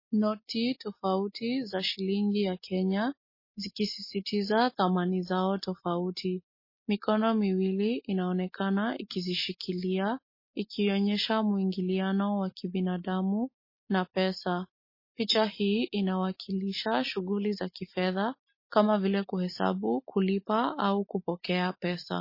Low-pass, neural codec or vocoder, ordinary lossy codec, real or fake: 5.4 kHz; none; MP3, 24 kbps; real